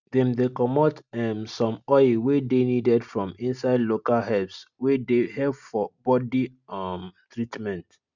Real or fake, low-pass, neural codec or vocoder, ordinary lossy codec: real; 7.2 kHz; none; none